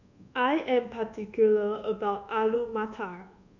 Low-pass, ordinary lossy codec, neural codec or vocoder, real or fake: 7.2 kHz; none; codec, 24 kHz, 1.2 kbps, DualCodec; fake